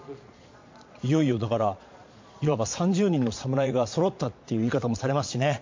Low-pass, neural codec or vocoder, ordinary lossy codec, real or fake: 7.2 kHz; vocoder, 22.05 kHz, 80 mel bands, Vocos; MP3, 48 kbps; fake